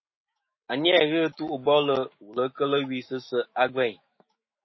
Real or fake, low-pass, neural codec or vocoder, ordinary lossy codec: fake; 7.2 kHz; autoencoder, 48 kHz, 128 numbers a frame, DAC-VAE, trained on Japanese speech; MP3, 24 kbps